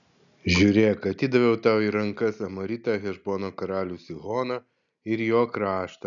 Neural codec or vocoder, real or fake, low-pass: none; real; 7.2 kHz